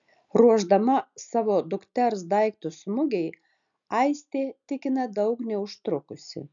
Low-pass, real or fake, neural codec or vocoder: 7.2 kHz; real; none